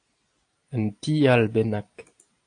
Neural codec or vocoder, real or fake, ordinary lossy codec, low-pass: none; real; AAC, 48 kbps; 9.9 kHz